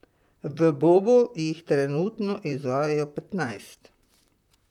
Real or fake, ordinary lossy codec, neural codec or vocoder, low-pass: fake; none; vocoder, 44.1 kHz, 128 mel bands, Pupu-Vocoder; 19.8 kHz